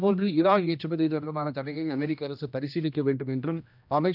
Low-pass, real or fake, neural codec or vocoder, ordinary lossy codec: 5.4 kHz; fake; codec, 16 kHz, 1 kbps, X-Codec, HuBERT features, trained on general audio; none